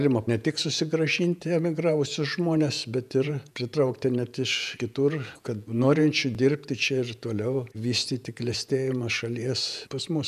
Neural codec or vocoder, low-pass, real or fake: vocoder, 48 kHz, 128 mel bands, Vocos; 14.4 kHz; fake